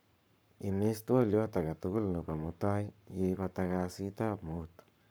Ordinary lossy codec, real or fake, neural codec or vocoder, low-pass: none; fake; codec, 44.1 kHz, 7.8 kbps, Pupu-Codec; none